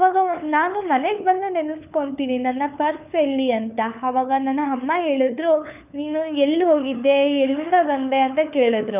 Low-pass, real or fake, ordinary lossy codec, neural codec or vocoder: 3.6 kHz; fake; none; codec, 16 kHz, 4 kbps, FunCodec, trained on LibriTTS, 50 frames a second